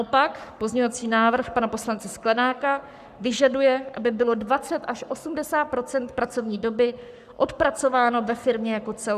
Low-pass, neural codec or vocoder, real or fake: 14.4 kHz; codec, 44.1 kHz, 7.8 kbps, Pupu-Codec; fake